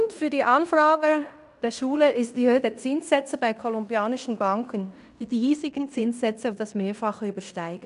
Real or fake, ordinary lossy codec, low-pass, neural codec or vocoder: fake; none; 10.8 kHz; codec, 16 kHz in and 24 kHz out, 0.9 kbps, LongCat-Audio-Codec, fine tuned four codebook decoder